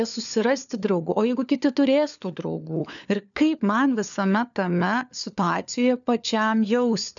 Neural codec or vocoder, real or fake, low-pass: codec, 16 kHz, 4 kbps, FunCodec, trained on LibriTTS, 50 frames a second; fake; 7.2 kHz